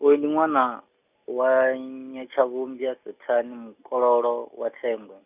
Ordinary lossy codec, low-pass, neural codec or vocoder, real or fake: none; 3.6 kHz; none; real